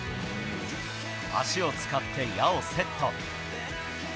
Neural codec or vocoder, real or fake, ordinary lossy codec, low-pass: none; real; none; none